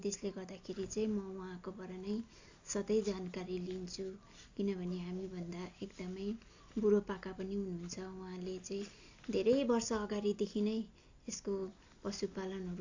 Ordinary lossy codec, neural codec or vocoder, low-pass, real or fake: AAC, 48 kbps; none; 7.2 kHz; real